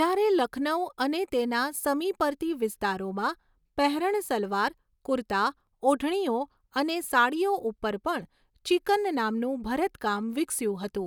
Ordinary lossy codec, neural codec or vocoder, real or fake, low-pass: none; none; real; 19.8 kHz